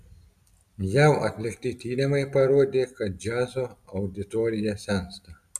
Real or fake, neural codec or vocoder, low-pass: real; none; 14.4 kHz